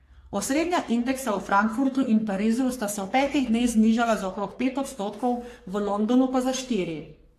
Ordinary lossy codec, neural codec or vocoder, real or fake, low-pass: AAC, 64 kbps; codec, 44.1 kHz, 3.4 kbps, Pupu-Codec; fake; 14.4 kHz